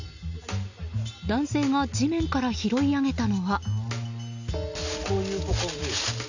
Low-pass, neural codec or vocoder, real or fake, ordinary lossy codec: 7.2 kHz; none; real; none